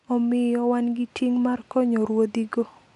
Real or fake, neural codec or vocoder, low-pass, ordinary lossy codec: real; none; 10.8 kHz; none